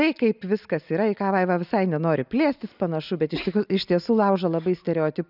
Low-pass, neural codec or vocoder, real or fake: 5.4 kHz; none; real